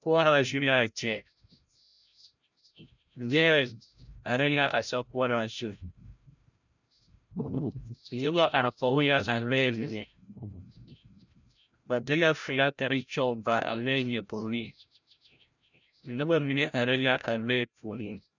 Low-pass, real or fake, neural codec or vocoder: 7.2 kHz; fake; codec, 16 kHz, 0.5 kbps, FreqCodec, larger model